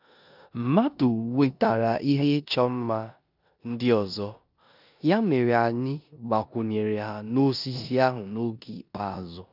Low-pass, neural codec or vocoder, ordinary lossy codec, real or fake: 5.4 kHz; codec, 16 kHz in and 24 kHz out, 0.9 kbps, LongCat-Audio-Codec, four codebook decoder; none; fake